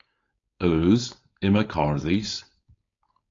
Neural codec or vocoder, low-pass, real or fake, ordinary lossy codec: codec, 16 kHz, 4.8 kbps, FACodec; 7.2 kHz; fake; AAC, 32 kbps